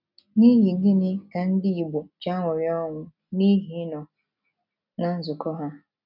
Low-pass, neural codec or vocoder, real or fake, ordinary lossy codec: 5.4 kHz; none; real; none